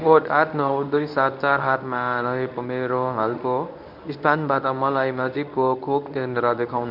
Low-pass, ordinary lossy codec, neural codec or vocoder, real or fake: 5.4 kHz; none; codec, 24 kHz, 0.9 kbps, WavTokenizer, medium speech release version 1; fake